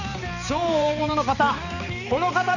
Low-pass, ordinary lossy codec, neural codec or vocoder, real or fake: 7.2 kHz; none; codec, 16 kHz, 4 kbps, X-Codec, HuBERT features, trained on balanced general audio; fake